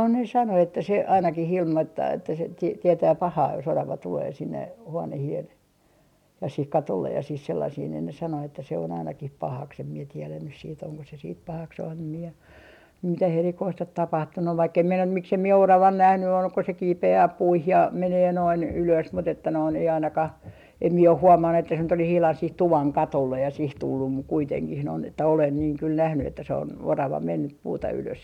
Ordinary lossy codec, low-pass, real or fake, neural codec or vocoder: MP3, 96 kbps; 19.8 kHz; real; none